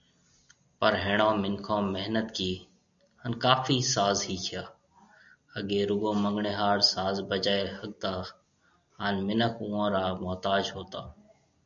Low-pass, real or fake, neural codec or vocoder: 7.2 kHz; real; none